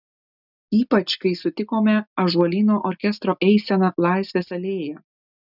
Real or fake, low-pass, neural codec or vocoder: real; 5.4 kHz; none